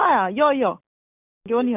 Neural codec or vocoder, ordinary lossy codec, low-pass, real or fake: none; none; 3.6 kHz; real